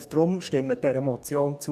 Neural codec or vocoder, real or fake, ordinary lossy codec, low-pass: codec, 44.1 kHz, 2.6 kbps, DAC; fake; none; 14.4 kHz